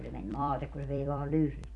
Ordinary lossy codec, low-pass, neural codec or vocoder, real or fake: none; 10.8 kHz; vocoder, 44.1 kHz, 128 mel bands every 256 samples, BigVGAN v2; fake